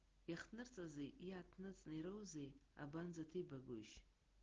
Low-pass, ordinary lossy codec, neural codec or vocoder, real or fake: 7.2 kHz; Opus, 16 kbps; none; real